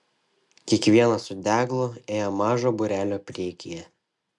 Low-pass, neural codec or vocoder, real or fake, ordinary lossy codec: 10.8 kHz; none; real; MP3, 96 kbps